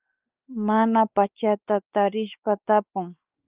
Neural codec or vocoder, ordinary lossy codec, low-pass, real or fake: codec, 16 kHz, 4 kbps, X-Codec, WavLM features, trained on Multilingual LibriSpeech; Opus, 32 kbps; 3.6 kHz; fake